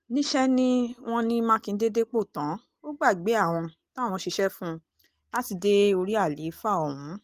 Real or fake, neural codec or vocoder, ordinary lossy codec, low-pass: real; none; Opus, 24 kbps; 14.4 kHz